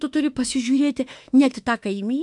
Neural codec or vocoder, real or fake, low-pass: autoencoder, 48 kHz, 32 numbers a frame, DAC-VAE, trained on Japanese speech; fake; 10.8 kHz